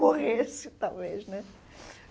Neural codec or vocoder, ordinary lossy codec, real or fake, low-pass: none; none; real; none